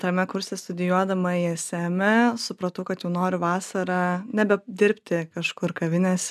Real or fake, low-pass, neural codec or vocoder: real; 14.4 kHz; none